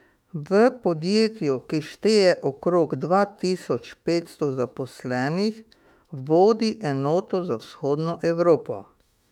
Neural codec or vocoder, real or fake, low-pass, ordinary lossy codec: autoencoder, 48 kHz, 32 numbers a frame, DAC-VAE, trained on Japanese speech; fake; 19.8 kHz; none